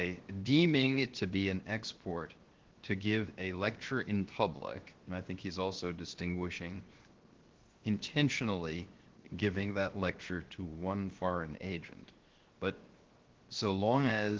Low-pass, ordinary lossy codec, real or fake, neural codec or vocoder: 7.2 kHz; Opus, 16 kbps; fake; codec, 16 kHz, 0.7 kbps, FocalCodec